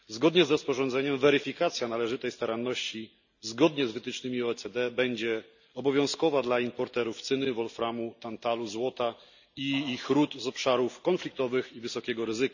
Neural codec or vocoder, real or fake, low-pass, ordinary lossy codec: none; real; 7.2 kHz; none